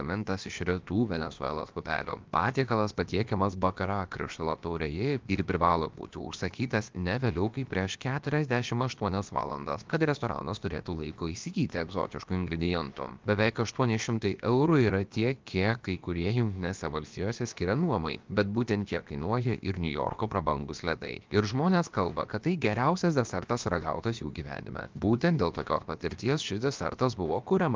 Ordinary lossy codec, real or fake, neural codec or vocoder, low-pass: Opus, 32 kbps; fake; codec, 16 kHz, about 1 kbps, DyCAST, with the encoder's durations; 7.2 kHz